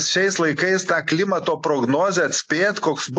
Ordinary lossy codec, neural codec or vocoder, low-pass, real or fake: AAC, 48 kbps; none; 10.8 kHz; real